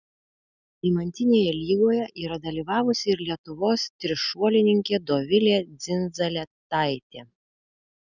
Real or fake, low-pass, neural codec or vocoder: real; 7.2 kHz; none